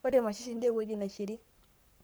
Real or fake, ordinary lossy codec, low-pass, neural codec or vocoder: fake; none; none; codec, 44.1 kHz, 7.8 kbps, Pupu-Codec